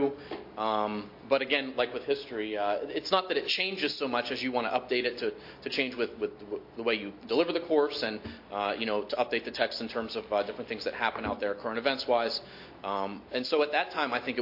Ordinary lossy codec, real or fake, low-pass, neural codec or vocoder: MP3, 32 kbps; real; 5.4 kHz; none